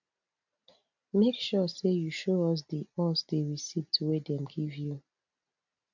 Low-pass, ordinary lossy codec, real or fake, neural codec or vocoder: 7.2 kHz; none; real; none